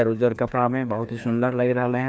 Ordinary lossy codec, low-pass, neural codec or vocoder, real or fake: none; none; codec, 16 kHz, 2 kbps, FreqCodec, larger model; fake